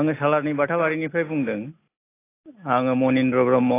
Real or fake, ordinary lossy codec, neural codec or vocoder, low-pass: real; AAC, 24 kbps; none; 3.6 kHz